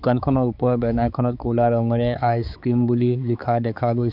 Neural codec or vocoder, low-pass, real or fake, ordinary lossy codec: codec, 16 kHz, 4 kbps, X-Codec, HuBERT features, trained on balanced general audio; 5.4 kHz; fake; none